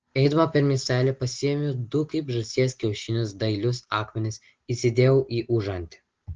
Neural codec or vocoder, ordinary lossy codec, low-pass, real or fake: none; Opus, 16 kbps; 7.2 kHz; real